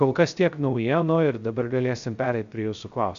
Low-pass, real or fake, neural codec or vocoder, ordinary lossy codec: 7.2 kHz; fake; codec, 16 kHz, 0.3 kbps, FocalCodec; MP3, 64 kbps